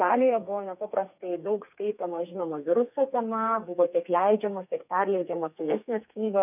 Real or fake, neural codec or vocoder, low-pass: fake; codec, 32 kHz, 1.9 kbps, SNAC; 3.6 kHz